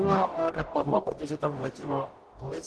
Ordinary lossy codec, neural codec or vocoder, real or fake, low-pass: Opus, 16 kbps; codec, 44.1 kHz, 0.9 kbps, DAC; fake; 10.8 kHz